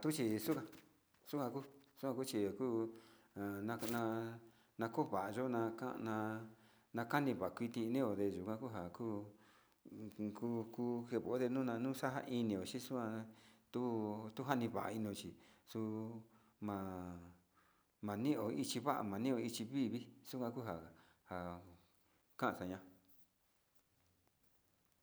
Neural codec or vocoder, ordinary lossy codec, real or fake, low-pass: none; none; real; none